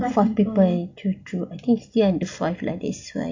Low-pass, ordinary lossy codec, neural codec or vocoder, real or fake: 7.2 kHz; AAC, 48 kbps; none; real